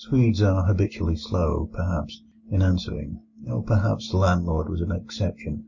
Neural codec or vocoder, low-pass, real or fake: none; 7.2 kHz; real